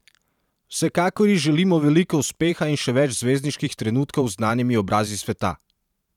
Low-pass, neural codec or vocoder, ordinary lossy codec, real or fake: 19.8 kHz; vocoder, 44.1 kHz, 128 mel bands every 512 samples, BigVGAN v2; none; fake